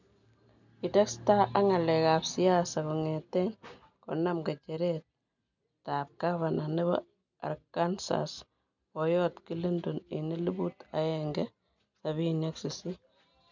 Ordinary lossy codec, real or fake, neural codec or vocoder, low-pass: none; real; none; 7.2 kHz